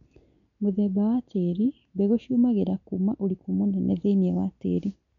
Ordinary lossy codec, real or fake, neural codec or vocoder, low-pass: none; real; none; 7.2 kHz